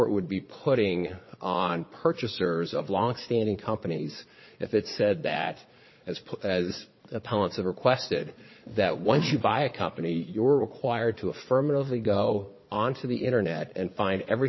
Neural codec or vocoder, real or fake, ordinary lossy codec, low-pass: vocoder, 22.05 kHz, 80 mel bands, Vocos; fake; MP3, 24 kbps; 7.2 kHz